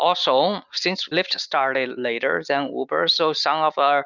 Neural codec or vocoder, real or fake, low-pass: none; real; 7.2 kHz